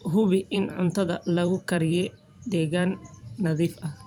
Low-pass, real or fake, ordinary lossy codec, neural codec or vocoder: 19.8 kHz; fake; none; vocoder, 44.1 kHz, 128 mel bands every 512 samples, BigVGAN v2